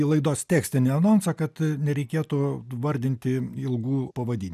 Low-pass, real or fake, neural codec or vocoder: 14.4 kHz; real; none